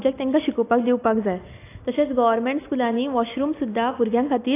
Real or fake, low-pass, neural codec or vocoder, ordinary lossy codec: real; 3.6 kHz; none; AAC, 24 kbps